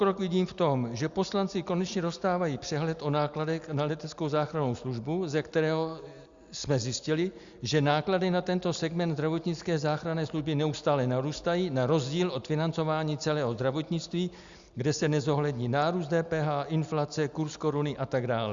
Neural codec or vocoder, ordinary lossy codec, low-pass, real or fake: none; Opus, 64 kbps; 7.2 kHz; real